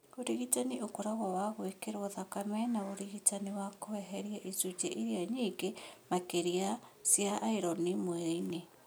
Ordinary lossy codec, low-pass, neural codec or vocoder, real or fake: none; none; none; real